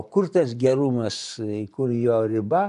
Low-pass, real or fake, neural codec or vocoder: 10.8 kHz; fake; vocoder, 24 kHz, 100 mel bands, Vocos